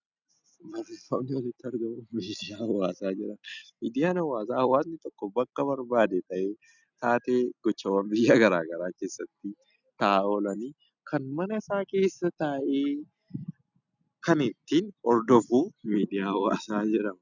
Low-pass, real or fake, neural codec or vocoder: 7.2 kHz; real; none